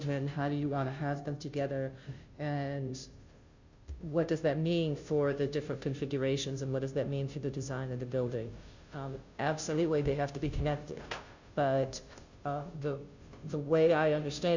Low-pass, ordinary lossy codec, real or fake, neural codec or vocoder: 7.2 kHz; Opus, 64 kbps; fake; codec, 16 kHz, 0.5 kbps, FunCodec, trained on Chinese and English, 25 frames a second